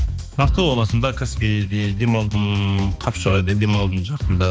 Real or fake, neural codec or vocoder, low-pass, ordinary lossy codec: fake; codec, 16 kHz, 2 kbps, X-Codec, HuBERT features, trained on balanced general audio; none; none